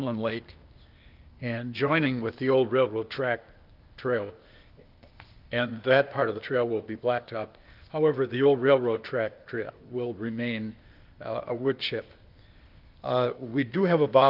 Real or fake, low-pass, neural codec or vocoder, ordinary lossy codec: fake; 5.4 kHz; codec, 16 kHz, 0.8 kbps, ZipCodec; Opus, 32 kbps